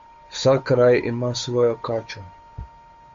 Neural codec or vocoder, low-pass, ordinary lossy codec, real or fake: codec, 16 kHz, 8 kbps, FunCodec, trained on Chinese and English, 25 frames a second; 7.2 kHz; MP3, 48 kbps; fake